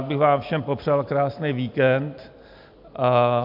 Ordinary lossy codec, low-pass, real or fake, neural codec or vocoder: AAC, 48 kbps; 5.4 kHz; real; none